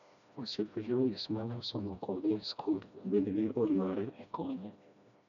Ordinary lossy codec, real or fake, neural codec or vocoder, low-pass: none; fake; codec, 16 kHz, 1 kbps, FreqCodec, smaller model; 7.2 kHz